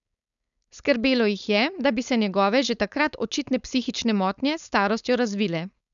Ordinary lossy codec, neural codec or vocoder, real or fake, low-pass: none; codec, 16 kHz, 4.8 kbps, FACodec; fake; 7.2 kHz